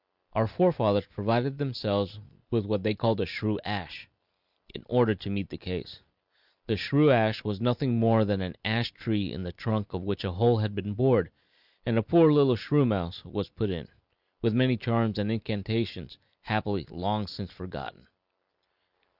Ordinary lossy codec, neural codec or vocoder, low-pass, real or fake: Opus, 64 kbps; none; 5.4 kHz; real